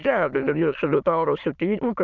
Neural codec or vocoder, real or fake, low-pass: autoencoder, 22.05 kHz, a latent of 192 numbers a frame, VITS, trained on many speakers; fake; 7.2 kHz